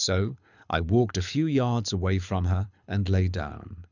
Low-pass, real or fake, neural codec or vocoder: 7.2 kHz; fake; codec, 24 kHz, 6 kbps, HILCodec